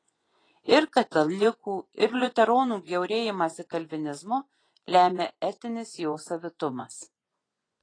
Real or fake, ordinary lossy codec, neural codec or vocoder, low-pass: fake; AAC, 32 kbps; vocoder, 24 kHz, 100 mel bands, Vocos; 9.9 kHz